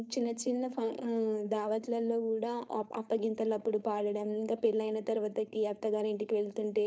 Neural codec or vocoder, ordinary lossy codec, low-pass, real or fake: codec, 16 kHz, 4.8 kbps, FACodec; none; none; fake